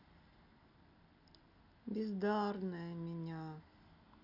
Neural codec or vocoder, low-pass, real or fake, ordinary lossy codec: none; 5.4 kHz; real; none